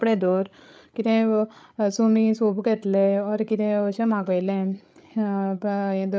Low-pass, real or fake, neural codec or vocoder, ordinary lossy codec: none; fake; codec, 16 kHz, 8 kbps, FreqCodec, larger model; none